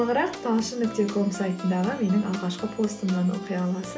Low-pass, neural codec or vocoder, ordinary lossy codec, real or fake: none; none; none; real